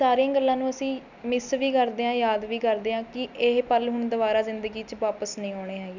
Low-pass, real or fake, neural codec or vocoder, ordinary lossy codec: 7.2 kHz; real; none; none